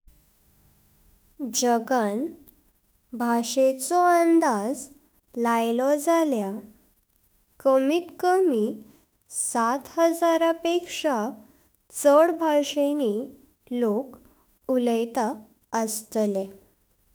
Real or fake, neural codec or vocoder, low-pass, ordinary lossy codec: fake; autoencoder, 48 kHz, 32 numbers a frame, DAC-VAE, trained on Japanese speech; none; none